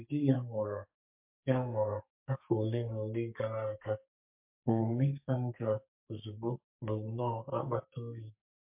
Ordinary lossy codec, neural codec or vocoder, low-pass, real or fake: MP3, 32 kbps; codec, 44.1 kHz, 2.6 kbps, SNAC; 3.6 kHz; fake